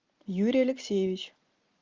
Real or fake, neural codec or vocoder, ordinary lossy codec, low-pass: real; none; Opus, 32 kbps; 7.2 kHz